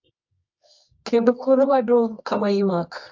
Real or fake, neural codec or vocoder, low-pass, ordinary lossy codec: fake; codec, 24 kHz, 0.9 kbps, WavTokenizer, medium music audio release; 7.2 kHz; MP3, 64 kbps